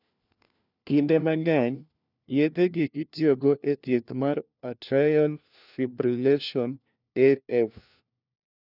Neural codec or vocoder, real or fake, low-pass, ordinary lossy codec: codec, 16 kHz, 1 kbps, FunCodec, trained on LibriTTS, 50 frames a second; fake; 5.4 kHz; none